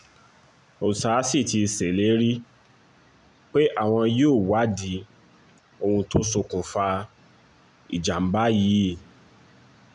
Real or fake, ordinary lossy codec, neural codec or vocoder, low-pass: real; none; none; 10.8 kHz